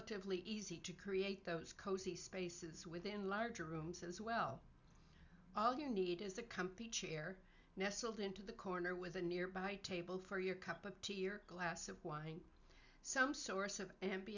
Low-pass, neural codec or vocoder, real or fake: 7.2 kHz; none; real